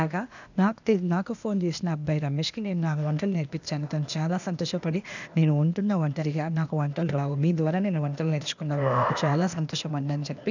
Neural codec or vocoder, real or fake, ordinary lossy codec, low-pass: codec, 16 kHz, 0.8 kbps, ZipCodec; fake; none; 7.2 kHz